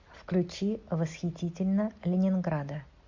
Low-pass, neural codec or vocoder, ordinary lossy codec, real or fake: 7.2 kHz; none; MP3, 48 kbps; real